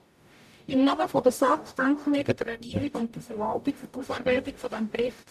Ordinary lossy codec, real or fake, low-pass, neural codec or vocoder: none; fake; 14.4 kHz; codec, 44.1 kHz, 0.9 kbps, DAC